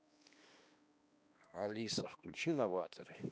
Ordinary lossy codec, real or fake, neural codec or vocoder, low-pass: none; fake; codec, 16 kHz, 1 kbps, X-Codec, HuBERT features, trained on balanced general audio; none